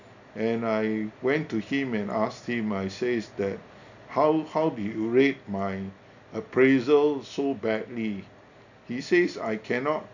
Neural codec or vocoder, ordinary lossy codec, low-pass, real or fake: none; none; 7.2 kHz; real